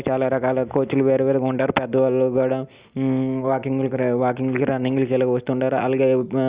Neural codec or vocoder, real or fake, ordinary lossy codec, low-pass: none; real; Opus, 64 kbps; 3.6 kHz